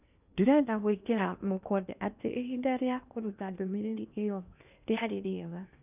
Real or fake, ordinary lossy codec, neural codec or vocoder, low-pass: fake; none; codec, 16 kHz in and 24 kHz out, 0.8 kbps, FocalCodec, streaming, 65536 codes; 3.6 kHz